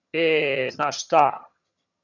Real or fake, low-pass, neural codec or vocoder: fake; 7.2 kHz; vocoder, 22.05 kHz, 80 mel bands, HiFi-GAN